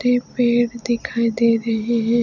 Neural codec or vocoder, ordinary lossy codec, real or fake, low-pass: none; none; real; 7.2 kHz